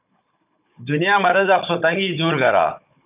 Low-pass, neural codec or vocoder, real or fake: 3.6 kHz; codec, 16 kHz, 16 kbps, FunCodec, trained on Chinese and English, 50 frames a second; fake